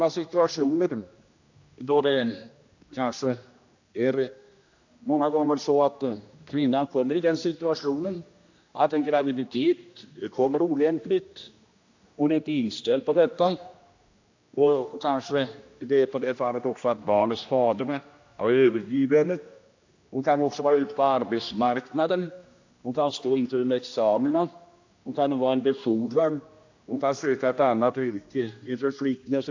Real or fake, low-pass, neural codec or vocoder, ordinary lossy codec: fake; 7.2 kHz; codec, 16 kHz, 1 kbps, X-Codec, HuBERT features, trained on general audio; AAC, 48 kbps